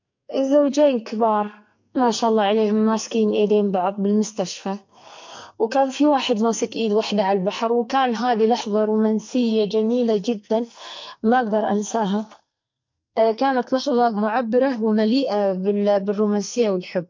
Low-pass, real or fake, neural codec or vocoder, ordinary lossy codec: 7.2 kHz; fake; codec, 44.1 kHz, 2.6 kbps, SNAC; MP3, 48 kbps